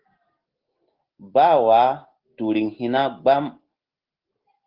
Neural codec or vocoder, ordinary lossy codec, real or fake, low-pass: none; Opus, 16 kbps; real; 5.4 kHz